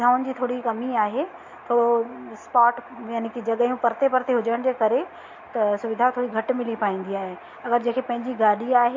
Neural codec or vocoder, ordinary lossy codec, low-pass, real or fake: none; MP3, 48 kbps; 7.2 kHz; real